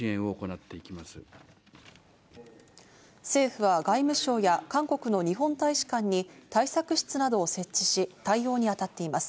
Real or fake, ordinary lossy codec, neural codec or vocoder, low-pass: real; none; none; none